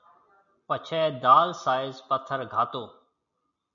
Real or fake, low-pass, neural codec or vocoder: real; 7.2 kHz; none